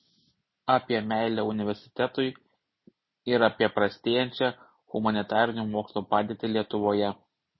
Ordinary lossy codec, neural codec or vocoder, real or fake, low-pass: MP3, 24 kbps; none; real; 7.2 kHz